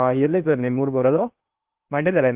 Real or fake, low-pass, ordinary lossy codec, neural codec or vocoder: fake; 3.6 kHz; Opus, 24 kbps; codec, 16 kHz in and 24 kHz out, 0.8 kbps, FocalCodec, streaming, 65536 codes